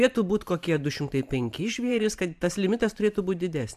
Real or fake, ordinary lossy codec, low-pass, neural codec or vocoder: fake; AAC, 96 kbps; 14.4 kHz; vocoder, 44.1 kHz, 128 mel bands every 512 samples, BigVGAN v2